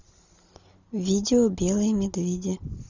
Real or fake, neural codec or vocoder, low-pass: real; none; 7.2 kHz